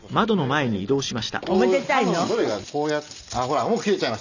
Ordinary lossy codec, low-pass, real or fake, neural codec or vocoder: none; 7.2 kHz; real; none